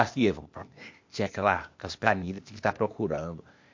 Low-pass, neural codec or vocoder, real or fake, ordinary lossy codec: 7.2 kHz; codec, 16 kHz, 0.8 kbps, ZipCodec; fake; MP3, 48 kbps